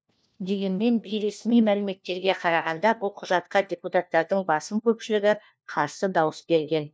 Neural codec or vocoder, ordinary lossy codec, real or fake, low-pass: codec, 16 kHz, 1 kbps, FunCodec, trained on LibriTTS, 50 frames a second; none; fake; none